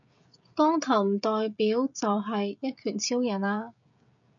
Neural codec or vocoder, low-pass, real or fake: codec, 16 kHz, 16 kbps, FreqCodec, smaller model; 7.2 kHz; fake